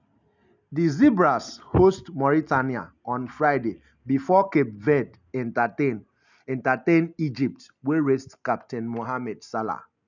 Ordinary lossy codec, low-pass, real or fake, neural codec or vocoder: none; 7.2 kHz; real; none